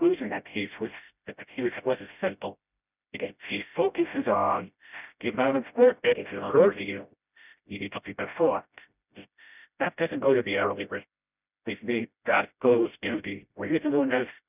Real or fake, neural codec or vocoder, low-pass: fake; codec, 16 kHz, 0.5 kbps, FreqCodec, smaller model; 3.6 kHz